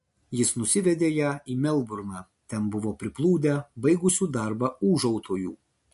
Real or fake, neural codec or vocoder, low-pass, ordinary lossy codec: real; none; 14.4 kHz; MP3, 48 kbps